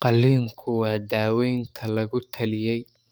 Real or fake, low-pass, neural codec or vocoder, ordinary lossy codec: fake; none; codec, 44.1 kHz, 7.8 kbps, DAC; none